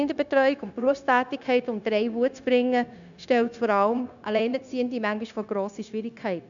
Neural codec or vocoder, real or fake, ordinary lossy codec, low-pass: codec, 16 kHz, 0.9 kbps, LongCat-Audio-Codec; fake; none; 7.2 kHz